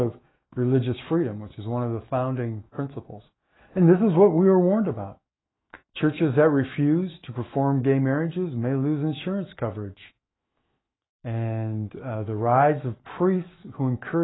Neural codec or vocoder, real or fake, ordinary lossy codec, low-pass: autoencoder, 48 kHz, 128 numbers a frame, DAC-VAE, trained on Japanese speech; fake; AAC, 16 kbps; 7.2 kHz